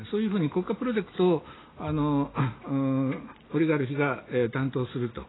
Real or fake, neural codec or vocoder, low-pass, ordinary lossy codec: real; none; 7.2 kHz; AAC, 16 kbps